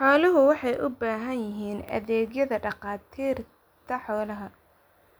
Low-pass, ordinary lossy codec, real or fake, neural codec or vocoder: none; none; real; none